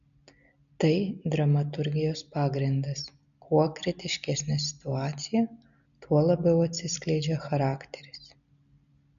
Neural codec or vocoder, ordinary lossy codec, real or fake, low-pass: none; MP3, 96 kbps; real; 7.2 kHz